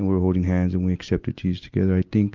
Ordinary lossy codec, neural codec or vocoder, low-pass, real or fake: Opus, 24 kbps; none; 7.2 kHz; real